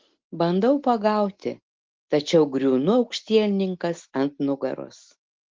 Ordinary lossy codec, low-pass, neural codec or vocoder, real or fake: Opus, 16 kbps; 7.2 kHz; none; real